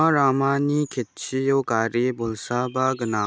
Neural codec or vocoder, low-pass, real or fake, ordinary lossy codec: none; none; real; none